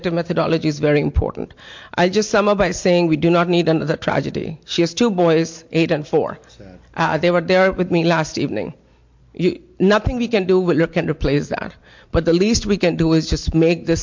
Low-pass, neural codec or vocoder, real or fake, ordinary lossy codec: 7.2 kHz; none; real; MP3, 48 kbps